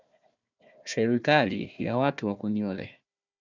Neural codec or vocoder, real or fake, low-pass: codec, 16 kHz, 1 kbps, FunCodec, trained on Chinese and English, 50 frames a second; fake; 7.2 kHz